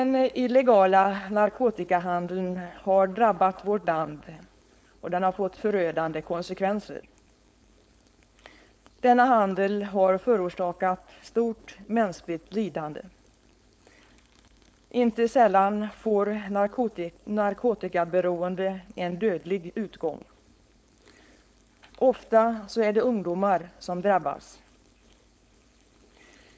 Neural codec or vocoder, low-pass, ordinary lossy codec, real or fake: codec, 16 kHz, 4.8 kbps, FACodec; none; none; fake